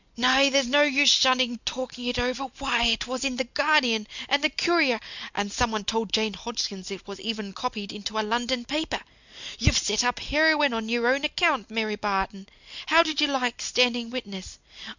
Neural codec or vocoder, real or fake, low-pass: none; real; 7.2 kHz